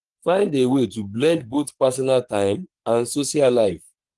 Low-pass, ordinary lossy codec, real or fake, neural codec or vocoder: 10.8 kHz; Opus, 24 kbps; fake; codec, 44.1 kHz, 3.4 kbps, Pupu-Codec